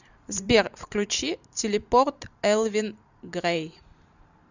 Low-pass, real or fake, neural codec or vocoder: 7.2 kHz; fake; vocoder, 44.1 kHz, 128 mel bands every 512 samples, BigVGAN v2